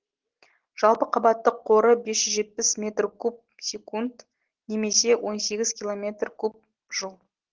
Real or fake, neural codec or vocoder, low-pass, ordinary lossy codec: real; none; 7.2 kHz; Opus, 16 kbps